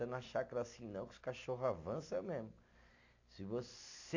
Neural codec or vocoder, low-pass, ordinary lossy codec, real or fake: none; 7.2 kHz; AAC, 48 kbps; real